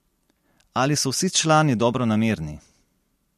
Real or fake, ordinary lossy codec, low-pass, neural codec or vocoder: real; MP3, 64 kbps; 14.4 kHz; none